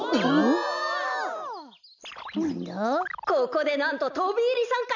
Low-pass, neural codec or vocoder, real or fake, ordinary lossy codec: 7.2 kHz; none; real; none